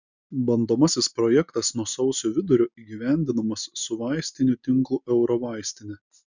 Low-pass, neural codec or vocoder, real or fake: 7.2 kHz; none; real